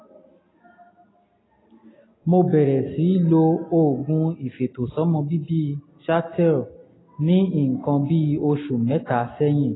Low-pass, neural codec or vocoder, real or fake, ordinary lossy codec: 7.2 kHz; none; real; AAC, 16 kbps